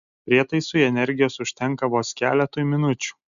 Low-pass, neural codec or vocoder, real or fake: 7.2 kHz; none; real